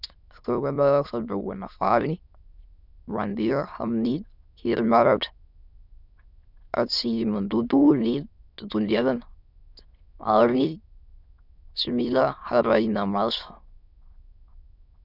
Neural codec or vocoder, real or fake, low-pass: autoencoder, 22.05 kHz, a latent of 192 numbers a frame, VITS, trained on many speakers; fake; 5.4 kHz